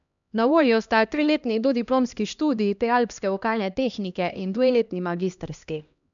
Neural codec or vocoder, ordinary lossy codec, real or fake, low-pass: codec, 16 kHz, 1 kbps, X-Codec, HuBERT features, trained on LibriSpeech; none; fake; 7.2 kHz